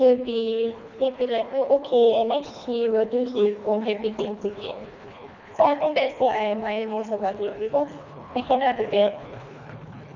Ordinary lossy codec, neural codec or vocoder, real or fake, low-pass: none; codec, 24 kHz, 1.5 kbps, HILCodec; fake; 7.2 kHz